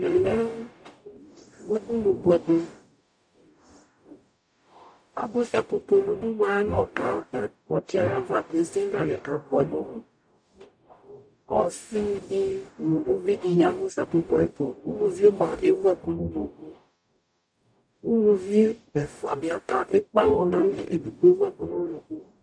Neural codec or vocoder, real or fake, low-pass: codec, 44.1 kHz, 0.9 kbps, DAC; fake; 9.9 kHz